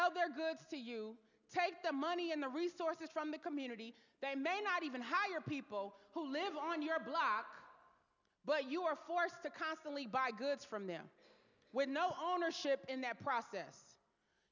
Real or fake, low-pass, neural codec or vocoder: real; 7.2 kHz; none